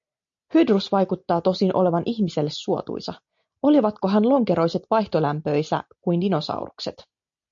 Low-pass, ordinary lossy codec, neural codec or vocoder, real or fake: 7.2 kHz; MP3, 48 kbps; none; real